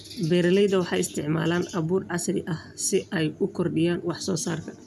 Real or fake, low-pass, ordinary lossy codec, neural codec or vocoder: real; 14.4 kHz; none; none